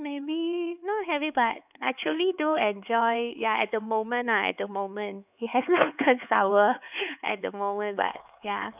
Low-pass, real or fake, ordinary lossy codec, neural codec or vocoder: 3.6 kHz; fake; none; codec, 16 kHz, 4 kbps, X-Codec, HuBERT features, trained on LibriSpeech